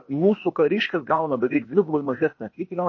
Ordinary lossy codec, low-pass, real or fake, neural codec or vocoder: MP3, 32 kbps; 7.2 kHz; fake; codec, 16 kHz, 0.8 kbps, ZipCodec